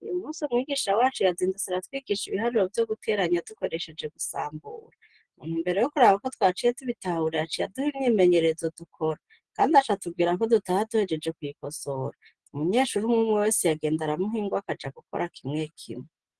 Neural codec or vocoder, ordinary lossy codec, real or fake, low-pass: none; Opus, 16 kbps; real; 10.8 kHz